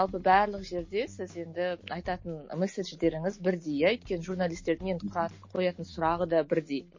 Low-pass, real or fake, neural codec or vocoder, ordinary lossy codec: 7.2 kHz; real; none; MP3, 32 kbps